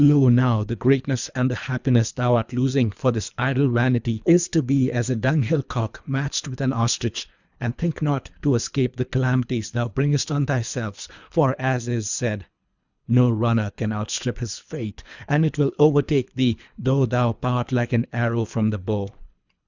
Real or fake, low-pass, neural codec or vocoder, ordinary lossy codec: fake; 7.2 kHz; codec, 24 kHz, 3 kbps, HILCodec; Opus, 64 kbps